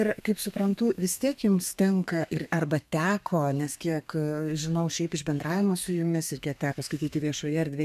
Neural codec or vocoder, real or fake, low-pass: codec, 32 kHz, 1.9 kbps, SNAC; fake; 14.4 kHz